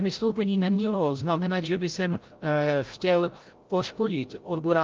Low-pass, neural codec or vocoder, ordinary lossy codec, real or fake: 7.2 kHz; codec, 16 kHz, 0.5 kbps, FreqCodec, larger model; Opus, 24 kbps; fake